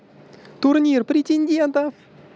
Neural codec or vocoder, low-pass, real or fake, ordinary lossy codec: none; none; real; none